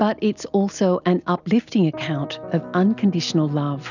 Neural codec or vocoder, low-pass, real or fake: none; 7.2 kHz; real